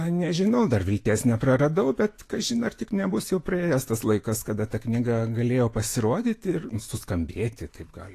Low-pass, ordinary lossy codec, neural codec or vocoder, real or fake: 14.4 kHz; AAC, 48 kbps; autoencoder, 48 kHz, 128 numbers a frame, DAC-VAE, trained on Japanese speech; fake